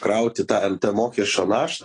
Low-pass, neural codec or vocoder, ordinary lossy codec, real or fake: 9.9 kHz; none; AAC, 32 kbps; real